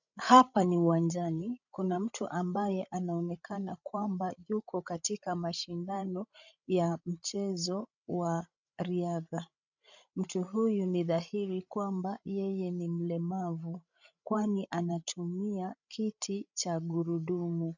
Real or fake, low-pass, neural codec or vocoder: fake; 7.2 kHz; codec, 16 kHz, 16 kbps, FreqCodec, larger model